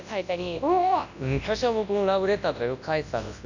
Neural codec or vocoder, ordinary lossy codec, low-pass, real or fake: codec, 24 kHz, 0.9 kbps, WavTokenizer, large speech release; none; 7.2 kHz; fake